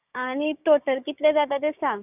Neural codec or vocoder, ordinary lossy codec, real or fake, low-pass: codec, 16 kHz, 6 kbps, DAC; none; fake; 3.6 kHz